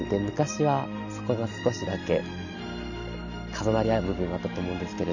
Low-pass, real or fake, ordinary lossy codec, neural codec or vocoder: 7.2 kHz; real; none; none